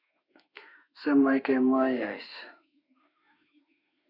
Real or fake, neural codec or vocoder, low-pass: fake; autoencoder, 48 kHz, 32 numbers a frame, DAC-VAE, trained on Japanese speech; 5.4 kHz